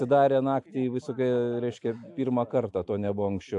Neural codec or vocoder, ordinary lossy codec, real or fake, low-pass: none; AAC, 64 kbps; real; 10.8 kHz